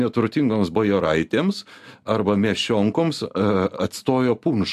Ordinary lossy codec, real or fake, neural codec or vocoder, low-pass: AAC, 96 kbps; real; none; 14.4 kHz